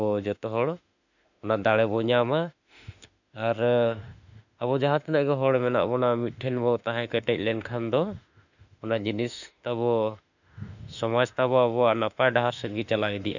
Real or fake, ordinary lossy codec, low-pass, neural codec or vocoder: fake; none; 7.2 kHz; autoencoder, 48 kHz, 32 numbers a frame, DAC-VAE, trained on Japanese speech